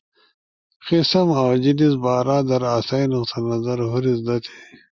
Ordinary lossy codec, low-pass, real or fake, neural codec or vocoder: Opus, 64 kbps; 7.2 kHz; real; none